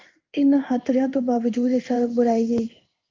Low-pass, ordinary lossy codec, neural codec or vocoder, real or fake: 7.2 kHz; Opus, 24 kbps; codec, 16 kHz in and 24 kHz out, 1 kbps, XY-Tokenizer; fake